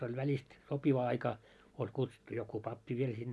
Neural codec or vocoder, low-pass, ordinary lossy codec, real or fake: none; none; none; real